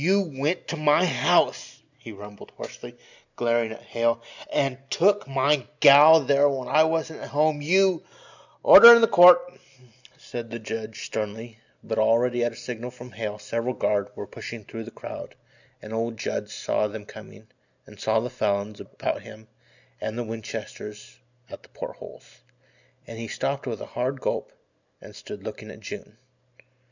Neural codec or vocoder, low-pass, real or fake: none; 7.2 kHz; real